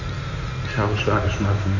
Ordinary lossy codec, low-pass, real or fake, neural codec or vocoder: none; 7.2 kHz; fake; codec, 16 kHz in and 24 kHz out, 2.2 kbps, FireRedTTS-2 codec